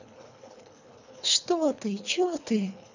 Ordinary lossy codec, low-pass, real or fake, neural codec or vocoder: none; 7.2 kHz; fake; codec, 24 kHz, 3 kbps, HILCodec